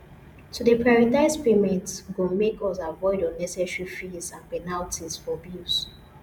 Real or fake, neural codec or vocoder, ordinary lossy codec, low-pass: fake; vocoder, 48 kHz, 128 mel bands, Vocos; none; 19.8 kHz